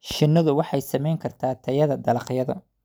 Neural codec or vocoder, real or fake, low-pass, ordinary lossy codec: none; real; none; none